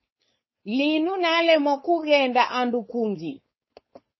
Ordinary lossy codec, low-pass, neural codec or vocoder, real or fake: MP3, 24 kbps; 7.2 kHz; codec, 16 kHz, 4.8 kbps, FACodec; fake